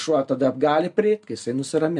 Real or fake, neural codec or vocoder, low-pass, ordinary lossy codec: real; none; 10.8 kHz; MP3, 64 kbps